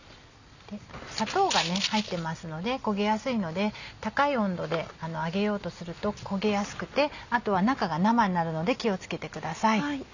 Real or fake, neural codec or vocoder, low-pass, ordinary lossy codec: real; none; 7.2 kHz; none